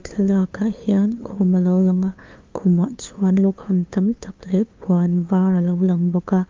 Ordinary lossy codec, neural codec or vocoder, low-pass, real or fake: Opus, 32 kbps; codec, 16 kHz, 2 kbps, FunCodec, trained on LibriTTS, 25 frames a second; 7.2 kHz; fake